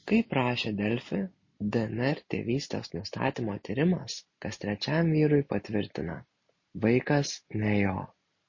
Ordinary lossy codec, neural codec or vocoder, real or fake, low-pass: MP3, 32 kbps; none; real; 7.2 kHz